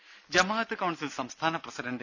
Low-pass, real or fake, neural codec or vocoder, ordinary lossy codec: 7.2 kHz; real; none; none